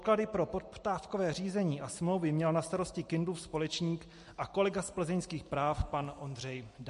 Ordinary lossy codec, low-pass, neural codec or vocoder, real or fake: MP3, 48 kbps; 14.4 kHz; none; real